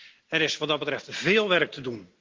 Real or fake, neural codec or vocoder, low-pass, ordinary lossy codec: real; none; 7.2 kHz; Opus, 32 kbps